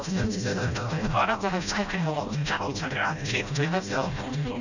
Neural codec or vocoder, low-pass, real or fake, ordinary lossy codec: codec, 16 kHz, 0.5 kbps, FreqCodec, smaller model; 7.2 kHz; fake; none